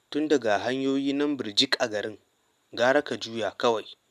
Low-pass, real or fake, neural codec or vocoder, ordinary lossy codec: 14.4 kHz; real; none; none